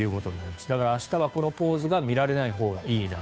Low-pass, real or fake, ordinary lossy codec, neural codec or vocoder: none; fake; none; codec, 16 kHz, 2 kbps, FunCodec, trained on Chinese and English, 25 frames a second